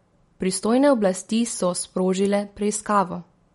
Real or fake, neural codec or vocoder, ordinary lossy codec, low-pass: real; none; MP3, 48 kbps; 19.8 kHz